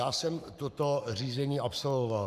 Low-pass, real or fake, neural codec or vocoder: 14.4 kHz; fake; codec, 44.1 kHz, 7.8 kbps, Pupu-Codec